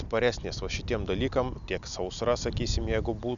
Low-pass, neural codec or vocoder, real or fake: 7.2 kHz; none; real